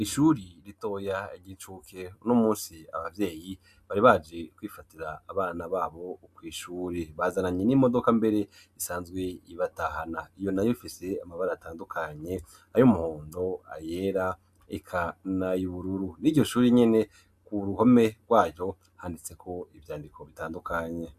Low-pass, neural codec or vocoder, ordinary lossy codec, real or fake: 14.4 kHz; none; AAC, 96 kbps; real